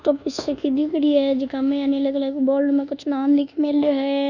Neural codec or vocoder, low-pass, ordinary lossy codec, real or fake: codec, 24 kHz, 1.2 kbps, DualCodec; 7.2 kHz; none; fake